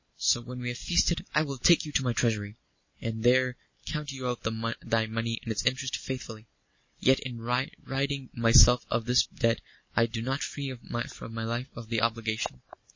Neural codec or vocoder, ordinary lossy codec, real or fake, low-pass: none; MP3, 32 kbps; real; 7.2 kHz